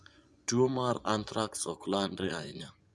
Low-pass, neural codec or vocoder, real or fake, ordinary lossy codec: none; vocoder, 24 kHz, 100 mel bands, Vocos; fake; none